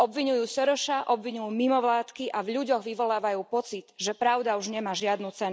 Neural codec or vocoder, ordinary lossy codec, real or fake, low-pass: none; none; real; none